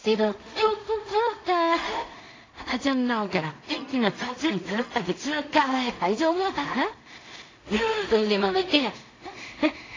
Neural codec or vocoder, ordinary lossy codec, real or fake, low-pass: codec, 16 kHz in and 24 kHz out, 0.4 kbps, LongCat-Audio-Codec, two codebook decoder; AAC, 32 kbps; fake; 7.2 kHz